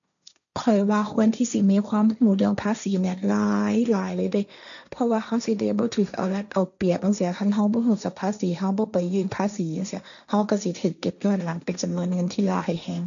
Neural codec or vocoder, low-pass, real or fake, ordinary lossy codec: codec, 16 kHz, 1.1 kbps, Voila-Tokenizer; 7.2 kHz; fake; none